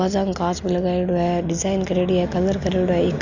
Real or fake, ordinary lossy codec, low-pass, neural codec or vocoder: real; none; 7.2 kHz; none